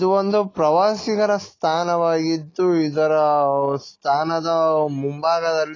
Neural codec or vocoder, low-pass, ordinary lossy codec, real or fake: none; 7.2 kHz; AAC, 32 kbps; real